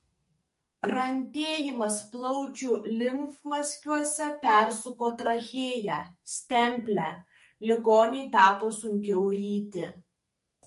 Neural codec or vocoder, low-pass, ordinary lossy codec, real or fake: codec, 44.1 kHz, 2.6 kbps, SNAC; 14.4 kHz; MP3, 48 kbps; fake